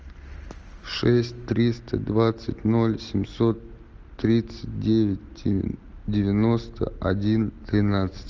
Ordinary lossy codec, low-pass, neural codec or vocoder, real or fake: Opus, 24 kbps; 7.2 kHz; none; real